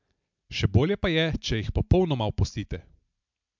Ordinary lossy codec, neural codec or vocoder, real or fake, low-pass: MP3, 64 kbps; none; real; 7.2 kHz